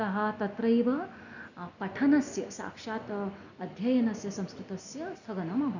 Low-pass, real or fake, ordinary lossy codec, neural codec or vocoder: 7.2 kHz; real; none; none